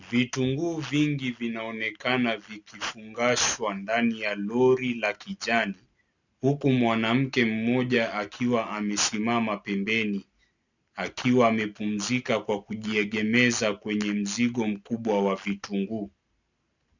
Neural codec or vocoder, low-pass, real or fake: none; 7.2 kHz; real